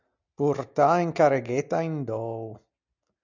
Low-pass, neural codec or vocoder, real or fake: 7.2 kHz; none; real